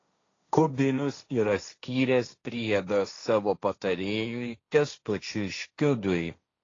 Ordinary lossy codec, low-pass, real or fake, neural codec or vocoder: AAC, 32 kbps; 7.2 kHz; fake; codec, 16 kHz, 1.1 kbps, Voila-Tokenizer